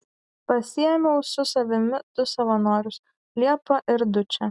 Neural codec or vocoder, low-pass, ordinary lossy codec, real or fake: none; 10.8 kHz; Opus, 64 kbps; real